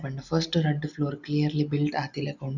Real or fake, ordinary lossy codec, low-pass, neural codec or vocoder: real; none; 7.2 kHz; none